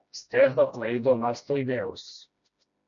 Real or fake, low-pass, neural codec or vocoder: fake; 7.2 kHz; codec, 16 kHz, 1 kbps, FreqCodec, smaller model